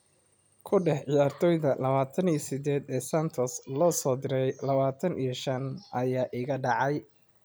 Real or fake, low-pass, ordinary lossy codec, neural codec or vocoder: real; none; none; none